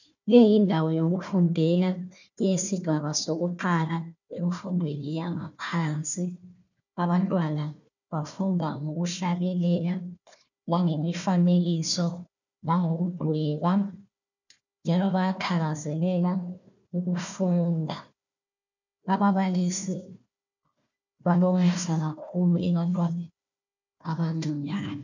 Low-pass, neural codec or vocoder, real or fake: 7.2 kHz; codec, 16 kHz, 1 kbps, FunCodec, trained on Chinese and English, 50 frames a second; fake